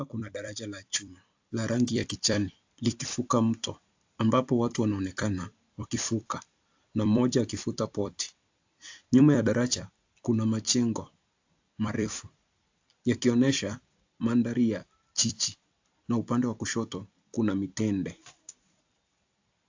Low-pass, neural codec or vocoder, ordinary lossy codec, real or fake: 7.2 kHz; vocoder, 44.1 kHz, 128 mel bands every 256 samples, BigVGAN v2; AAC, 48 kbps; fake